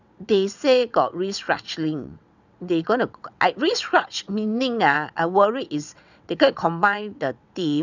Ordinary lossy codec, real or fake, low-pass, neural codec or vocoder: none; fake; 7.2 kHz; vocoder, 22.05 kHz, 80 mel bands, WaveNeXt